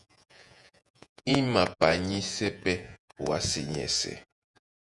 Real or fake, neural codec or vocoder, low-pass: fake; vocoder, 48 kHz, 128 mel bands, Vocos; 10.8 kHz